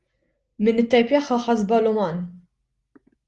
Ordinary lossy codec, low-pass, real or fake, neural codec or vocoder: Opus, 24 kbps; 10.8 kHz; real; none